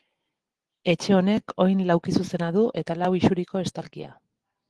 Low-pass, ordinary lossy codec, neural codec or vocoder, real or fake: 10.8 kHz; Opus, 16 kbps; none; real